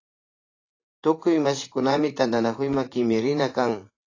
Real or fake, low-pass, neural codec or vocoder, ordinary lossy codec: fake; 7.2 kHz; vocoder, 44.1 kHz, 80 mel bands, Vocos; AAC, 32 kbps